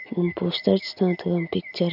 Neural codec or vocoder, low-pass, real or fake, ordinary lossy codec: none; 5.4 kHz; real; AAC, 48 kbps